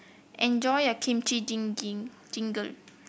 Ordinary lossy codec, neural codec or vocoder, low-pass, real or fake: none; none; none; real